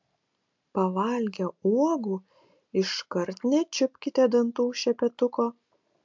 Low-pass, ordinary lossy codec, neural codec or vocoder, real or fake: 7.2 kHz; MP3, 64 kbps; none; real